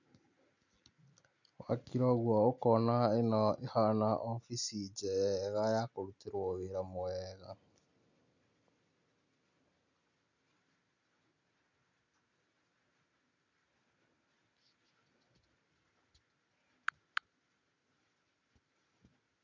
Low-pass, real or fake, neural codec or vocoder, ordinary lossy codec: 7.2 kHz; real; none; none